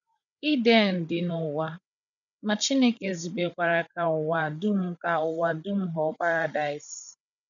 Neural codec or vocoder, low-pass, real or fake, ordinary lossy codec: codec, 16 kHz, 8 kbps, FreqCodec, larger model; 7.2 kHz; fake; none